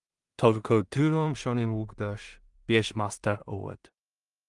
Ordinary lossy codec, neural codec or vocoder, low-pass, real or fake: Opus, 32 kbps; codec, 16 kHz in and 24 kHz out, 0.4 kbps, LongCat-Audio-Codec, two codebook decoder; 10.8 kHz; fake